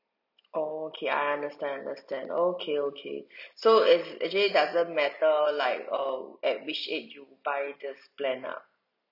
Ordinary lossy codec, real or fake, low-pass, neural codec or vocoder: none; real; 5.4 kHz; none